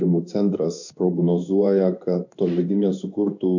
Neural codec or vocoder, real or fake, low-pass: codec, 16 kHz in and 24 kHz out, 1 kbps, XY-Tokenizer; fake; 7.2 kHz